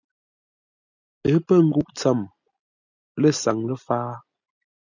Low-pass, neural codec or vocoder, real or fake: 7.2 kHz; none; real